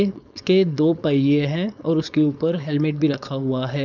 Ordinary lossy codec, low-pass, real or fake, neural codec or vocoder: none; 7.2 kHz; fake; codec, 16 kHz, 4.8 kbps, FACodec